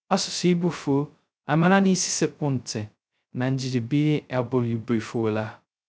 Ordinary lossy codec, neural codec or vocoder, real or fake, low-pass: none; codec, 16 kHz, 0.2 kbps, FocalCodec; fake; none